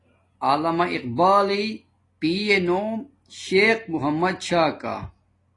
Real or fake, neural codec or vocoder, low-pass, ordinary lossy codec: real; none; 10.8 kHz; AAC, 32 kbps